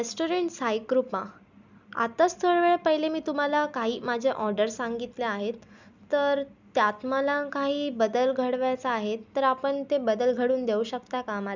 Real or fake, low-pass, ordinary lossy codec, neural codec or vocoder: real; 7.2 kHz; none; none